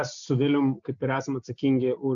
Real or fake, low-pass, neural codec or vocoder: real; 7.2 kHz; none